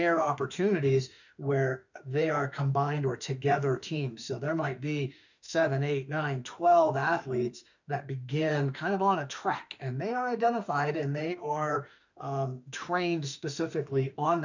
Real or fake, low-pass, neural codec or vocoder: fake; 7.2 kHz; autoencoder, 48 kHz, 32 numbers a frame, DAC-VAE, trained on Japanese speech